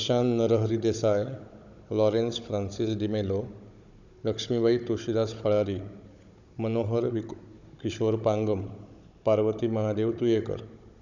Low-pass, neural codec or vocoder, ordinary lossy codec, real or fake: 7.2 kHz; codec, 16 kHz, 16 kbps, FunCodec, trained on Chinese and English, 50 frames a second; none; fake